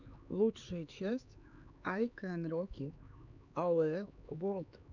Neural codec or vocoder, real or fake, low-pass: codec, 16 kHz, 4 kbps, X-Codec, HuBERT features, trained on LibriSpeech; fake; 7.2 kHz